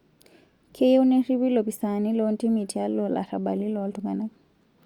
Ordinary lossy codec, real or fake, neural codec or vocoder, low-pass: Opus, 64 kbps; real; none; 19.8 kHz